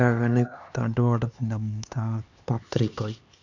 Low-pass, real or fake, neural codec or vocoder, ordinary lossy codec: 7.2 kHz; fake; codec, 16 kHz, 2 kbps, X-Codec, HuBERT features, trained on LibriSpeech; none